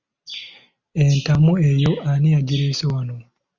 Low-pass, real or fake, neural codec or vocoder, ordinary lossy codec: 7.2 kHz; real; none; Opus, 64 kbps